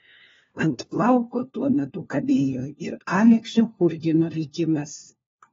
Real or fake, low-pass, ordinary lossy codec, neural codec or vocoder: fake; 7.2 kHz; AAC, 24 kbps; codec, 16 kHz, 1 kbps, FunCodec, trained on LibriTTS, 50 frames a second